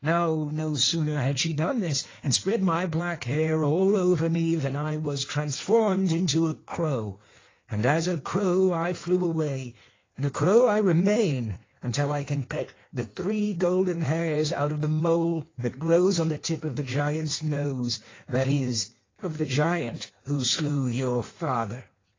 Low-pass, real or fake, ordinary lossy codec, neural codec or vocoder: 7.2 kHz; fake; AAC, 32 kbps; codec, 16 kHz in and 24 kHz out, 1.1 kbps, FireRedTTS-2 codec